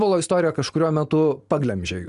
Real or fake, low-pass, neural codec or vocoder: real; 10.8 kHz; none